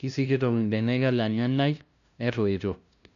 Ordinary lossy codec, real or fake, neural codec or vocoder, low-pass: none; fake; codec, 16 kHz, 0.5 kbps, FunCodec, trained on LibriTTS, 25 frames a second; 7.2 kHz